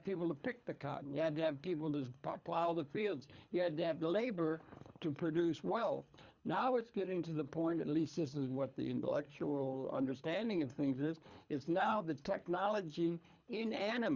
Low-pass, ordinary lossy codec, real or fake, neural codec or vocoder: 7.2 kHz; Opus, 64 kbps; fake; codec, 24 kHz, 3 kbps, HILCodec